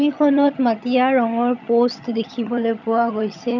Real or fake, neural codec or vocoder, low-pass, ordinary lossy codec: fake; vocoder, 22.05 kHz, 80 mel bands, HiFi-GAN; 7.2 kHz; none